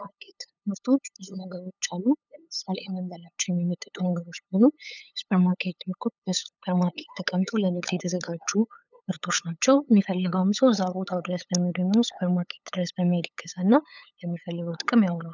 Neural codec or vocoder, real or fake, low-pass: codec, 16 kHz, 8 kbps, FunCodec, trained on LibriTTS, 25 frames a second; fake; 7.2 kHz